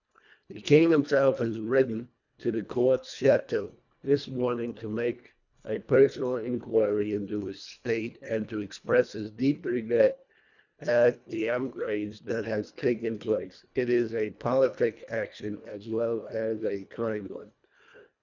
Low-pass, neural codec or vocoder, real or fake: 7.2 kHz; codec, 24 kHz, 1.5 kbps, HILCodec; fake